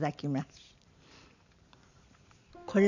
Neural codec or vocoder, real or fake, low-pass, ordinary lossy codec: vocoder, 44.1 kHz, 128 mel bands every 512 samples, BigVGAN v2; fake; 7.2 kHz; none